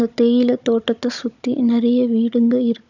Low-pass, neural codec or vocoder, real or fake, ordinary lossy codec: 7.2 kHz; codec, 16 kHz, 16 kbps, FunCodec, trained on Chinese and English, 50 frames a second; fake; none